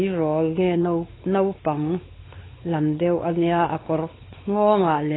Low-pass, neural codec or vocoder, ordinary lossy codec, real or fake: 7.2 kHz; codec, 16 kHz, 4 kbps, X-Codec, WavLM features, trained on Multilingual LibriSpeech; AAC, 16 kbps; fake